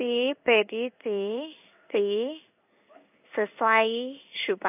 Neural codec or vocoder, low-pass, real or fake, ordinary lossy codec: codec, 16 kHz in and 24 kHz out, 1 kbps, XY-Tokenizer; 3.6 kHz; fake; none